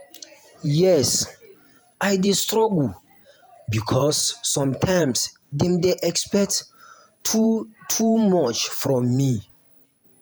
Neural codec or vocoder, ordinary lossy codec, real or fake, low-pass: vocoder, 48 kHz, 128 mel bands, Vocos; none; fake; none